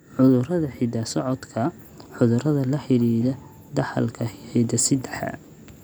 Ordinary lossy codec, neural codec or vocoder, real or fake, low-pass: none; none; real; none